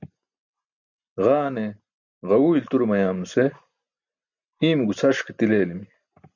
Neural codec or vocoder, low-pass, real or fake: none; 7.2 kHz; real